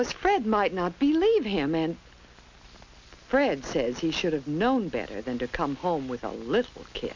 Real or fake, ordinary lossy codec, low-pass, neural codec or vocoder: real; MP3, 64 kbps; 7.2 kHz; none